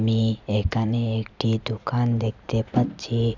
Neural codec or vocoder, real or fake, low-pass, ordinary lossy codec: none; real; 7.2 kHz; none